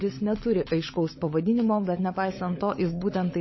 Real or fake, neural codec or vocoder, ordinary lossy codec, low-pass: fake; codec, 16 kHz, 16 kbps, FunCodec, trained on LibriTTS, 50 frames a second; MP3, 24 kbps; 7.2 kHz